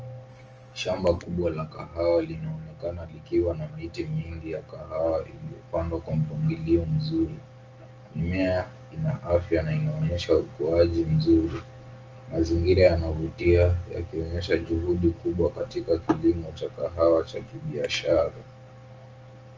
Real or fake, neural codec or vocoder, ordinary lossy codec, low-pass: real; none; Opus, 24 kbps; 7.2 kHz